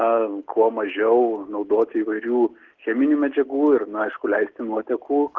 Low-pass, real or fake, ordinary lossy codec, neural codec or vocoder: 7.2 kHz; real; Opus, 16 kbps; none